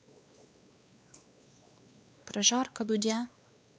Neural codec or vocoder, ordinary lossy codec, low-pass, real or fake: codec, 16 kHz, 2 kbps, X-Codec, WavLM features, trained on Multilingual LibriSpeech; none; none; fake